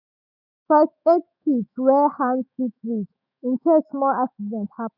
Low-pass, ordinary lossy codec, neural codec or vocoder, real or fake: 5.4 kHz; none; autoencoder, 48 kHz, 128 numbers a frame, DAC-VAE, trained on Japanese speech; fake